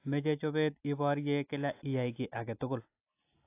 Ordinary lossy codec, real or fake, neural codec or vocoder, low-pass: AAC, 24 kbps; real; none; 3.6 kHz